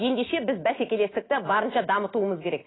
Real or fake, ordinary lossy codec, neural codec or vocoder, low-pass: real; AAC, 16 kbps; none; 7.2 kHz